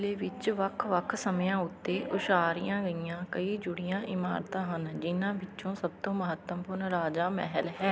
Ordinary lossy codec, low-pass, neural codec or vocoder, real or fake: none; none; none; real